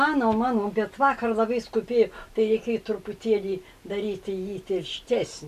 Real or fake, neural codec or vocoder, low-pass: real; none; 14.4 kHz